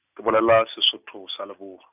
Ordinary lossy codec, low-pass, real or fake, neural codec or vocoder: none; 3.6 kHz; real; none